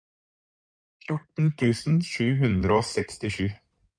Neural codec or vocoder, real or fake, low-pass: codec, 16 kHz in and 24 kHz out, 2.2 kbps, FireRedTTS-2 codec; fake; 9.9 kHz